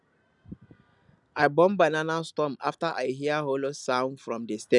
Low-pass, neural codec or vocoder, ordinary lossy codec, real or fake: 10.8 kHz; none; none; real